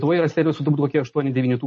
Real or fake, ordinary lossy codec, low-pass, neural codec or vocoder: real; MP3, 32 kbps; 9.9 kHz; none